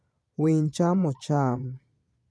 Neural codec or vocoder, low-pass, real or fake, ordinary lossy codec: vocoder, 22.05 kHz, 80 mel bands, Vocos; none; fake; none